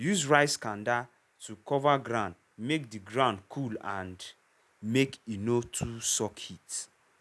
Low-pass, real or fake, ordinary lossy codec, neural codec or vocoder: none; real; none; none